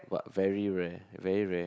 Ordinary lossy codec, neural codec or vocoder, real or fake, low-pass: none; none; real; none